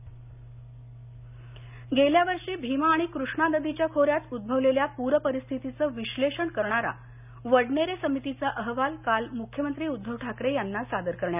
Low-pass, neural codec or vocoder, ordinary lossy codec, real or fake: 3.6 kHz; none; none; real